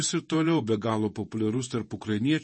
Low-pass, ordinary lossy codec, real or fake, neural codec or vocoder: 10.8 kHz; MP3, 32 kbps; fake; vocoder, 48 kHz, 128 mel bands, Vocos